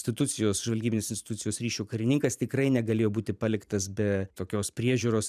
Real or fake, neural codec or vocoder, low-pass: fake; vocoder, 44.1 kHz, 128 mel bands every 512 samples, BigVGAN v2; 14.4 kHz